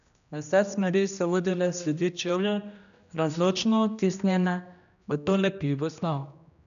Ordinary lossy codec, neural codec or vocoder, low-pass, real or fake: none; codec, 16 kHz, 1 kbps, X-Codec, HuBERT features, trained on general audio; 7.2 kHz; fake